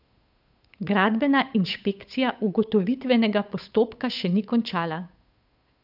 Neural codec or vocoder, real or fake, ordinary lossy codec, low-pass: codec, 16 kHz, 8 kbps, FunCodec, trained on Chinese and English, 25 frames a second; fake; none; 5.4 kHz